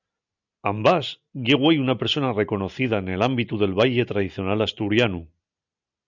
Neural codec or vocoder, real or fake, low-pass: none; real; 7.2 kHz